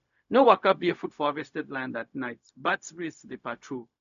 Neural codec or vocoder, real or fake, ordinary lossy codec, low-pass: codec, 16 kHz, 0.4 kbps, LongCat-Audio-Codec; fake; none; 7.2 kHz